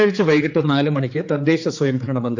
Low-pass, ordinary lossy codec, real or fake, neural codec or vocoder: 7.2 kHz; AAC, 48 kbps; fake; codec, 16 kHz, 4 kbps, X-Codec, HuBERT features, trained on general audio